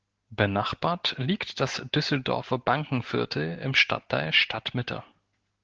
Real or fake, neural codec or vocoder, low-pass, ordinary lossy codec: real; none; 7.2 kHz; Opus, 16 kbps